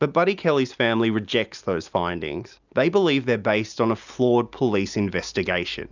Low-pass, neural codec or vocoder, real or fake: 7.2 kHz; none; real